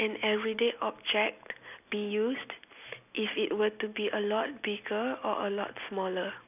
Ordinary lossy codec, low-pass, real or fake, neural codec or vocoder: none; 3.6 kHz; real; none